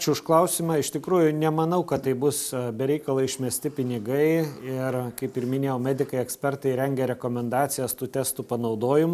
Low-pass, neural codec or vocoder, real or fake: 14.4 kHz; none; real